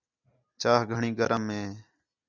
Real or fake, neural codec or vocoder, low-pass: real; none; 7.2 kHz